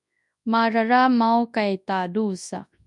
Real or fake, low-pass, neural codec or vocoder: fake; 10.8 kHz; codec, 24 kHz, 0.9 kbps, WavTokenizer, large speech release